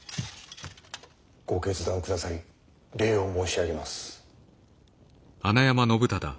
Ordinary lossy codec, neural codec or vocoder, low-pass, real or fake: none; none; none; real